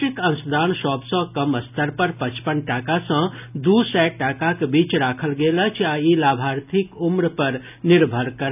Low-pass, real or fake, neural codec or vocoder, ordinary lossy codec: 3.6 kHz; real; none; none